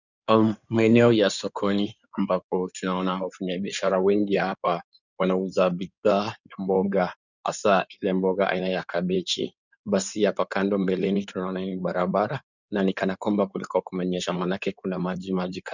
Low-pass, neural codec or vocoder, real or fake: 7.2 kHz; codec, 16 kHz in and 24 kHz out, 2.2 kbps, FireRedTTS-2 codec; fake